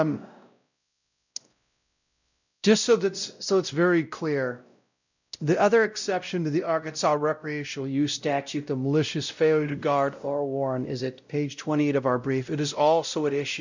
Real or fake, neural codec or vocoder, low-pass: fake; codec, 16 kHz, 0.5 kbps, X-Codec, WavLM features, trained on Multilingual LibriSpeech; 7.2 kHz